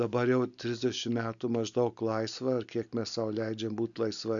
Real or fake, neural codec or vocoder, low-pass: real; none; 7.2 kHz